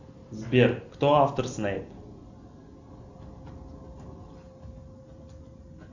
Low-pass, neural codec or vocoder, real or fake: 7.2 kHz; none; real